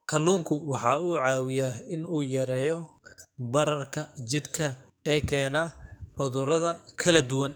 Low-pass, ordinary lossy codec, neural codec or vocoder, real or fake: none; none; codec, 44.1 kHz, 2.6 kbps, SNAC; fake